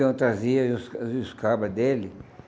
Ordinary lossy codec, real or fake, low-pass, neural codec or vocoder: none; real; none; none